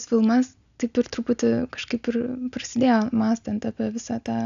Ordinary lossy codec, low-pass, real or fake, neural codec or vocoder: AAC, 96 kbps; 7.2 kHz; real; none